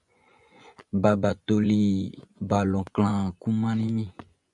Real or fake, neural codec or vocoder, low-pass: fake; vocoder, 24 kHz, 100 mel bands, Vocos; 10.8 kHz